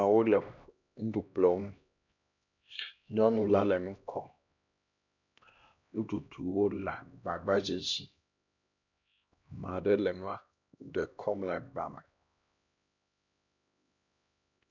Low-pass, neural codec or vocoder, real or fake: 7.2 kHz; codec, 16 kHz, 1 kbps, X-Codec, HuBERT features, trained on LibriSpeech; fake